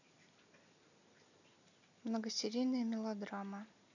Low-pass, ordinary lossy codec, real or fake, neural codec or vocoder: 7.2 kHz; none; real; none